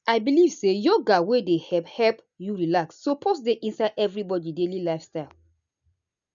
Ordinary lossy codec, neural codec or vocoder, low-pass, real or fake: none; none; 7.2 kHz; real